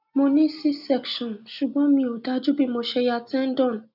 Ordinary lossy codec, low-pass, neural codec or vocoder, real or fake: none; 5.4 kHz; none; real